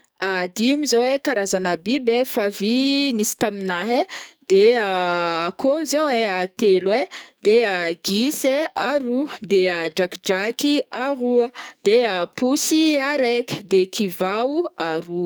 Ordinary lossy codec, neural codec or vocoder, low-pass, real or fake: none; codec, 44.1 kHz, 2.6 kbps, SNAC; none; fake